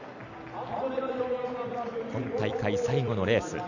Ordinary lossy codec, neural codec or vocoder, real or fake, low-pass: none; vocoder, 44.1 kHz, 128 mel bands every 256 samples, BigVGAN v2; fake; 7.2 kHz